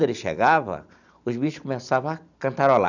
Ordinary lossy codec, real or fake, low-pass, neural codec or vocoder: none; real; 7.2 kHz; none